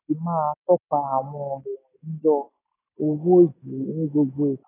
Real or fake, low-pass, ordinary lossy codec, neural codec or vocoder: real; 3.6 kHz; AAC, 16 kbps; none